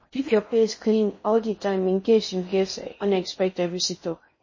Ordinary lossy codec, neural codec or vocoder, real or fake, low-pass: MP3, 32 kbps; codec, 16 kHz in and 24 kHz out, 0.6 kbps, FocalCodec, streaming, 4096 codes; fake; 7.2 kHz